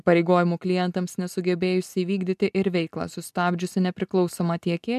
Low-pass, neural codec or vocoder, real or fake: 14.4 kHz; none; real